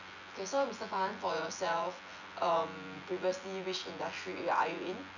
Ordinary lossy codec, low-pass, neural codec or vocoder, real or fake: none; 7.2 kHz; vocoder, 24 kHz, 100 mel bands, Vocos; fake